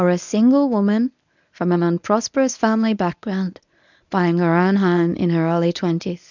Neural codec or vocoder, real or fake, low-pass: codec, 24 kHz, 0.9 kbps, WavTokenizer, medium speech release version 1; fake; 7.2 kHz